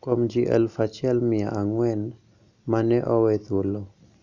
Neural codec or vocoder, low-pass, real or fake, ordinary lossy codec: none; 7.2 kHz; real; none